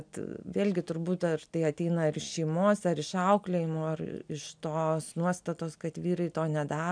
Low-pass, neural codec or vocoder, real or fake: 9.9 kHz; none; real